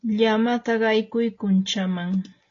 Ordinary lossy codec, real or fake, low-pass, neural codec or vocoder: AAC, 32 kbps; real; 7.2 kHz; none